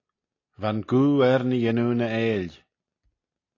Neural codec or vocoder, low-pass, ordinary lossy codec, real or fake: none; 7.2 kHz; AAC, 32 kbps; real